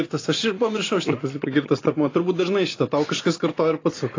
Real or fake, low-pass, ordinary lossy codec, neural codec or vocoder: real; 7.2 kHz; AAC, 32 kbps; none